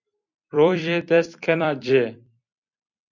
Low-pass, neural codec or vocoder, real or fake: 7.2 kHz; vocoder, 44.1 kHz, 128 mel bands every 256 samples, BigVGAN v2; fake